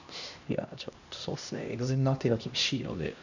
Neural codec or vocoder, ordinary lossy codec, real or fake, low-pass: codec, 16 kHz, 1 kbps, X-Codec, HuBERT features, trained on LibriSpeech; none; fake; 7.2 kHz